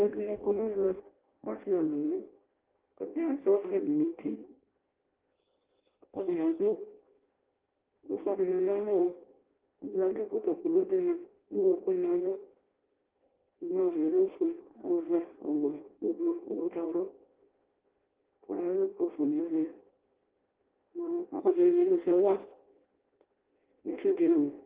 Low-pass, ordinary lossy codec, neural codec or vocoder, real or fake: 3.6 kHz; Opus, 16 kbps; codec, 16 kHz in and 24 kHz out, 0.6 kbps, FireRedTTS-2 codec; fake